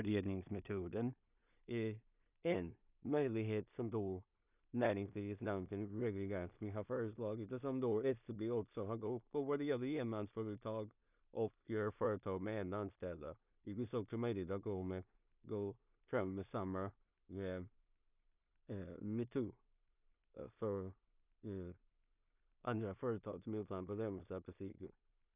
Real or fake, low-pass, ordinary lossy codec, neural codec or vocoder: fake; 3.6 kHz; none; codec, 16 kHz in and 24 kHz out, 0.4 kbps, LongCat-Audio-Codec, two codebook decoder